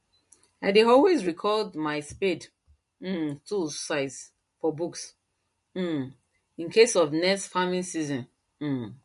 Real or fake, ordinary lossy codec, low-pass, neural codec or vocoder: real; MP3, 48 kbps; 14.4 kHz; none